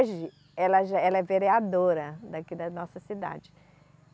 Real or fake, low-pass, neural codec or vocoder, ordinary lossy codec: real; none; none; none